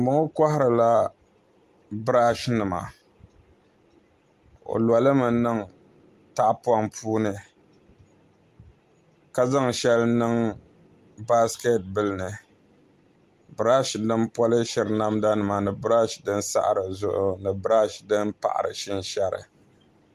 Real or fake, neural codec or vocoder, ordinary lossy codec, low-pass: real; none; Opus, 32 kbps; 14.4 kHz